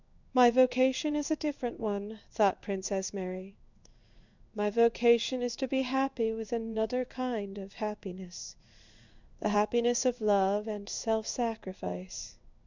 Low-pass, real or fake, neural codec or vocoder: 7.2 kHz; fake; codec, 16 kHz in and 24 kHz out, 1 kbps, XY-Tokenizer